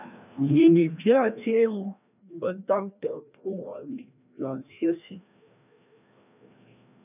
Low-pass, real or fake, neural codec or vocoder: 3.6 kHz; fake; codec, 16 kHz, 1 kbps, FreqCodec, larger model